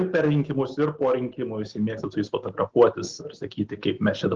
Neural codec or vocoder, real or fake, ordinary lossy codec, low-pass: none; real; Opus, 16 kbps; 7.2 kHz